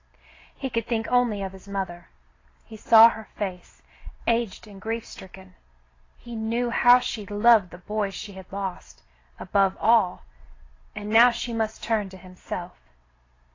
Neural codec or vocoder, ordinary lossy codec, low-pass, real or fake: none; AAC, 32 kbps; 7.2 kHz; real